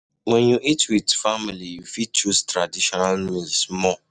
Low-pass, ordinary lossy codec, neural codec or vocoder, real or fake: 9.9 kHz; none; none; real